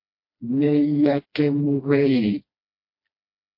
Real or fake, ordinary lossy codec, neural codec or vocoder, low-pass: fake; MP3, 32 kbps; codec, 16 kHz, 1 kbps, FreqCodec, smaller model; 5.4 kHz